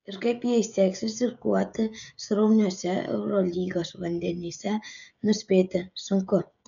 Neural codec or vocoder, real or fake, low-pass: codec, 16 kHz, 16 kbps, FreqCodec, smaller model; fake; 7.2 kHz